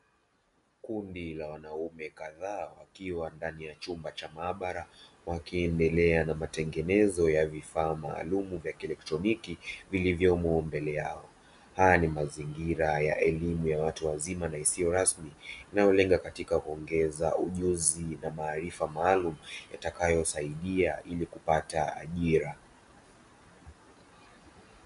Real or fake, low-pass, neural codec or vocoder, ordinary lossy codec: real; 10.8 kHz; none; MP3, 96 kbps